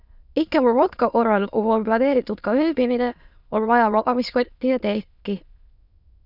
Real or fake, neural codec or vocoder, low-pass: fake; autoencoder, 22.05 kHz, a latent of 192 numbers a frame, VITS, trained on many speakers; 5.4 kHz